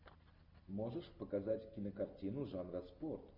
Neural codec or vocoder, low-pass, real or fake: none; 5.4 kHz; real